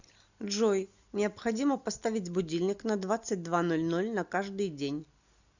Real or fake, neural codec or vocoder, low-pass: real; none; 7.2 kHz